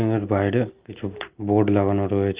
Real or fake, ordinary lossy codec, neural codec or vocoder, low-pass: real; Opus, 24 kbps; none; 3.6 kHz